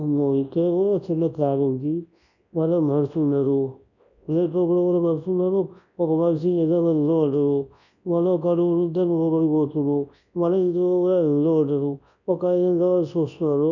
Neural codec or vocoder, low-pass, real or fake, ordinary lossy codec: codec, 24 kHz, 0.9 kbps, WavTokenizer, large speech release; 7.2 kHz; fake; none